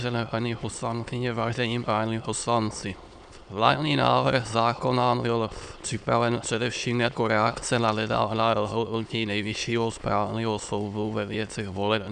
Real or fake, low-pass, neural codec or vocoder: fake; 9.9 kHz; autoencoder, 22.05 kHz, a latent of 192 numbers a frame, VITS, trained on many speakers